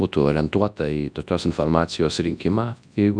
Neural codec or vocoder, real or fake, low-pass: codec, 24 kHz, 0.9 kbps, WavTokenizer, large speech release; fake; 9.9 kHz